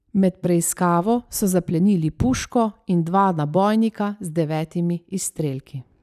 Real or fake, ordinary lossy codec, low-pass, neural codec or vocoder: real; none; 14.4 kHz; none